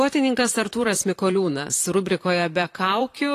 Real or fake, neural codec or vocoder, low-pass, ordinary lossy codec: fake; vocoder, 44.1 kHz, 128 mel bands, Pupu-Vocoder; 14.4 kHz; AAC, 48 kbps